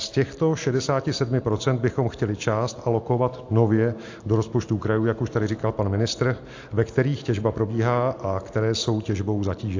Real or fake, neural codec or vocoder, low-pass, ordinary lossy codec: real; none; 7.2 kHz; AAC, 48 kbps